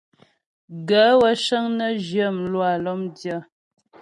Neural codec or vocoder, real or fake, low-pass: none; real; 10.8 kHz